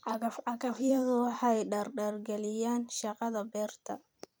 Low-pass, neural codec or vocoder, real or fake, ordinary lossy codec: none; vocoder, 44.1 kHz, 128 mel bands every 256 samples, BigVGAN v2; fake; none